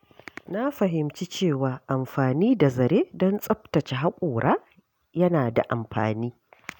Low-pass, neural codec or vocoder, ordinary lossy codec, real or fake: 19.8 kHz; none; none; real